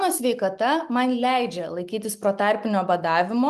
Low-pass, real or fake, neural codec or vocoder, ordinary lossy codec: 14.4 kHz; real; none; Opus, 32 kbps